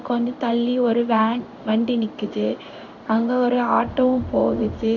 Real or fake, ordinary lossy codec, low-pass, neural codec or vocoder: fake; none; 7.2 kHz; codec, 16 kHz in and 24 kHz out, 1 kbps, XY-Tokenizer